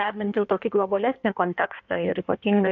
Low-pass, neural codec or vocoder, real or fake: 7.2 kHz; codec, 16 kHz in and 24 kHz out, 1.1 kbps, FireRedTTS-2 codec; fake